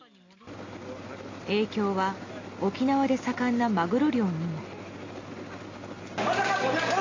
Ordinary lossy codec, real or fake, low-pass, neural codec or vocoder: AAC, 32 kbps; real; 7.2 kHz; none